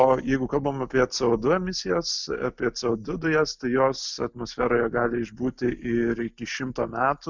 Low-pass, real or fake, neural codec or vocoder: 7.2 kHz; real; none